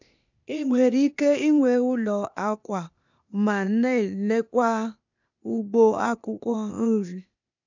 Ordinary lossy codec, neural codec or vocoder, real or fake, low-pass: none; codec, 16 kHz, 0.8 kbps, ZipCodec; fake; 7.2 kHz